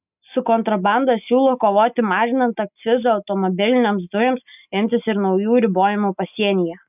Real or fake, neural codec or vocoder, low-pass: real; none; 3.6 kHz